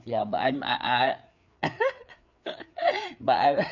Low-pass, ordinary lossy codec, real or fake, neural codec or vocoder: 7.2 kHz; none; fake; codec, 16 kHz, 4 kbps, FreqCodec, larger model